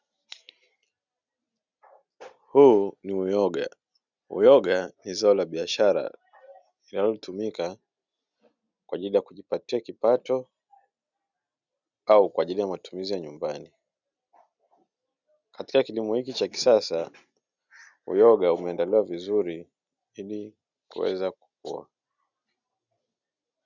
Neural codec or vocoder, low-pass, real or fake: none; 7.2 kHz; real